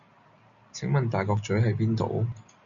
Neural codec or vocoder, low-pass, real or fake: none; 7.2 kHz; real